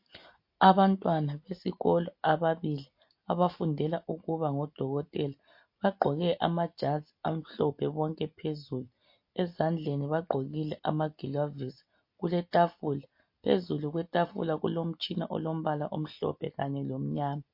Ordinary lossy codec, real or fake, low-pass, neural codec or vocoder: MP3, 32 kbps; real; 5.4 kHz; none